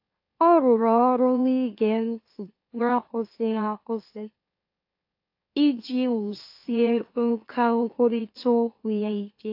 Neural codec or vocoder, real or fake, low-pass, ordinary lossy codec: autoencoder, 44.1 kHz, a latent of 192 numbers a frame, MeloTTS; fake; 5.4 kHz; AAC, 32 kbps